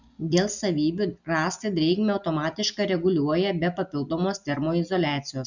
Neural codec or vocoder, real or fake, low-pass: none; real; 7.2 kHz